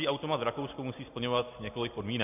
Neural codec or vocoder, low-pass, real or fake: none; 3.6 kHz; real